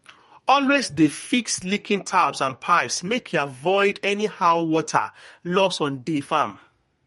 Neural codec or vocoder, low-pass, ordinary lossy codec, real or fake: codec, 32 kHz, 1.9 kbps, SNAC; 14.4 kHz; MP3, 48 kbps; fake